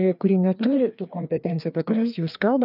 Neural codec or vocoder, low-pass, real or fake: codec, 16 kHz, 2 kbps, FreqCodec, larger model; 5.4 kHz; fake